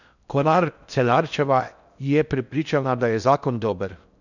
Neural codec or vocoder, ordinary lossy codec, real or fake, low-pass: codec, 16 kHz in and 24 kHz out, 0.6 kbps, FocalCodec, streaming, 4096 codes; none; fake; 7.2 kHz